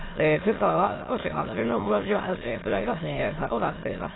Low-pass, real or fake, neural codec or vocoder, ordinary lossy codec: 7.2 kHz; fake; autoencoder, 22.05 kHz, a latent of 192 numbers a frame, VITS, trained on many speakers; AAC, 16 kbps